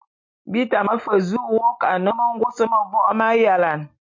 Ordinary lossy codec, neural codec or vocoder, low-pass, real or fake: MP3, 48 kbps; none; 7.2 kHz; real